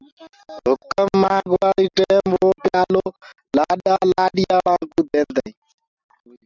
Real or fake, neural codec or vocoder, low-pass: real; none; 7.2 kHz